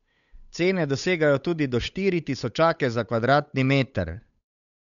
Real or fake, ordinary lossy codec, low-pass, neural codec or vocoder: fake; none; 7.2 kHz; codec, 16 kHz, 8 kbps, FunCodec, trained on Chinese and English, 25 frames a second